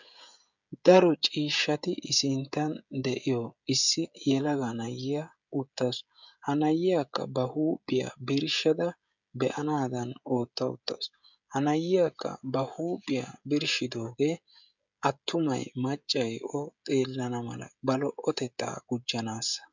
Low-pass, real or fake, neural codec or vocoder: 7.2 kHz; fake; codec, 16 kHz, 16 kbps, FreqCodec, smaller model